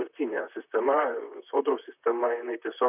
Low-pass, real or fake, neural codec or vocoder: 3.6 kHz; fake; vocoder, 44.1 kHz, 128 mel bands, Pupu-Vocoder